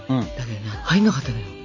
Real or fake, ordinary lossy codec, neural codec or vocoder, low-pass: real; none; none; 7.2 kHz